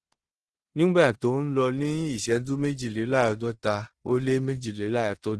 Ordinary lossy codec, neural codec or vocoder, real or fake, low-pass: Opus, 16 kbps; codec, 16 kHz in and 24 kHz out, 0.9 kbps, LongCat-Audio-Codec, fine tuned four codebook decoder; fake; 10.8 kHz